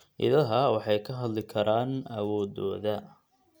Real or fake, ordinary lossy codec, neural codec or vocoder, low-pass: real; none; none; none